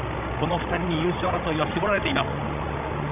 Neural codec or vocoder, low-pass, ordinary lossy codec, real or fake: codec, 16 kHz, 16 kbps, FreqCodec, larger model; 3.6 kHz; none; fake